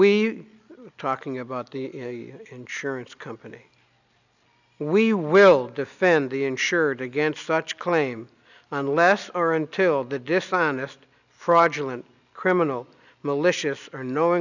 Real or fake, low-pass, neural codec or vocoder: real; 7.2 kHz; none